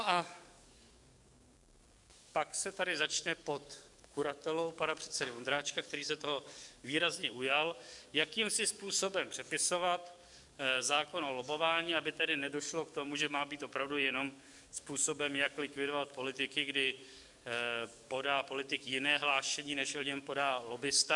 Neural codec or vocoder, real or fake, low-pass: codec, 44.1 kHz, 7.8 kbps, DAC; fake; 10.8 kHz